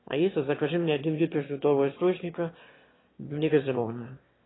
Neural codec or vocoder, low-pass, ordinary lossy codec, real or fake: autoencoder, 22.05 kHz, a latent of 192 numbers a frame, VITS, trained on one speaker; 7.2 kHz; AAC, 16 kbps; fake